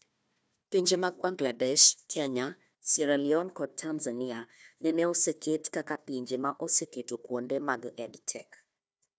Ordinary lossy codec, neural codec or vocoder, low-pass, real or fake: none; codec, 16 kHz, 1 kbps, FunCodec, trained on Chinese and English, 50 frames a second; none; fake